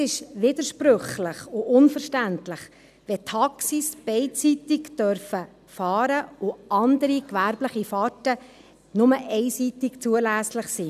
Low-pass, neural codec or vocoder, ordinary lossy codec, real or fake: 14.4 kHz; none; none; real